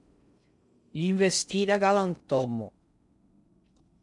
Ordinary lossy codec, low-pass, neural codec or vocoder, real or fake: MP3, 64 kbps; 10.8 kHz; codec, 16 kHz in and 24 kHz out, 0.6 kbps, FocalCodec, streaming, 4096 codes; fake